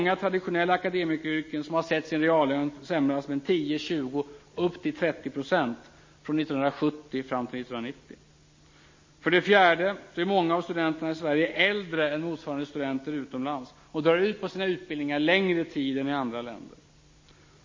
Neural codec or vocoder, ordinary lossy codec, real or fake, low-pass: none; MP3, 32 kbps; real; 7.2 kHz